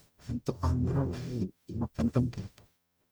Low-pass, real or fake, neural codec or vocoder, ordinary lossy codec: none; fake; codec, 44.1 kHz, 0.9 kbps, DAC; none